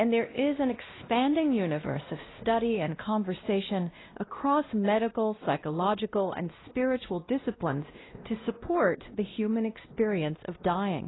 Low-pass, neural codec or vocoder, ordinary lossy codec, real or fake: 7.2 kHz; codec, 16 kHz, 1 kbps, X-Codec, WavLM features, trained on Multilingual LibriSpeech; AAC, 16 kbps; fake